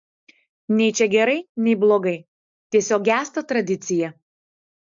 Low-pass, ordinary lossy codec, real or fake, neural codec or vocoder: 7.2 kHz; MP3, 64 kbps; real; none